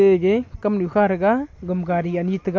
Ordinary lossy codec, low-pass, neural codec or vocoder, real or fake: MP3, 48 kbps; 7.2 kHz; none; real